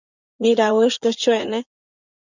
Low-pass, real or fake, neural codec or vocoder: 7.2 kHz; fake; vocoder, 44.1 kHz, 80 mel bands, Vocos